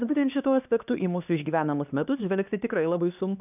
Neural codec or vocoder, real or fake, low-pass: codec, 16 kHz, 2 kbps, X-Codec, HuBERT features, trained on LibriSpeech; fake; 3.6 kHz